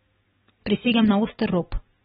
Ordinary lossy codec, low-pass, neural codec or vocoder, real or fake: AAC, 16 kbps; 19.8 kHz; vocoder, 44.1 kHz, 128 mel bands every 256 samples, BigVGAN v2; fake